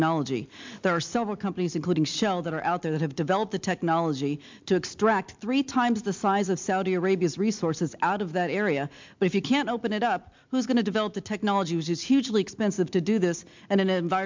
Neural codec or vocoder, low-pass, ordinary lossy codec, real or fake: none; 7.2 kHz; MP3, 64 kbps; real